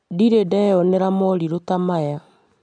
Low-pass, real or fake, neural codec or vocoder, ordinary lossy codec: 9.9 kHz; real; none; none